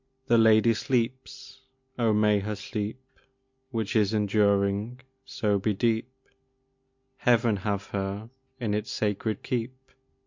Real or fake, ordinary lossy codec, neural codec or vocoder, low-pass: real; MP3, 48 kbps; none; 7.2 kHz